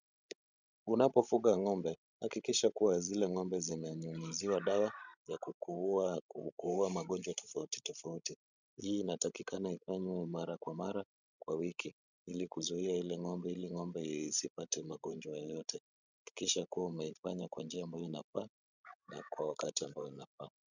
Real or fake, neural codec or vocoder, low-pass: fake; codec, 16 kHz, 16 kbps, FreqCodec, larger model; 7.2 kHz